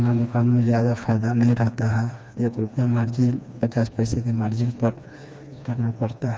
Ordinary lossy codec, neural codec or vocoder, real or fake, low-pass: none; codec, 16 kHz, 2 kbps, FreqCodec, smaller model; fake; none